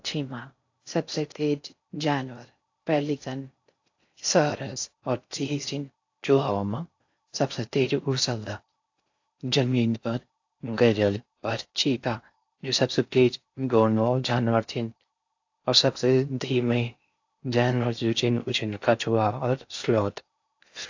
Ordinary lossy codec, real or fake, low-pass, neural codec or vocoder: AAC, 48 kbps; fake; 7.2 kHz; codec, 16 kHz in and 24 kHz out, 0.6 kbps, FocalCodec, streaming, 4096 codes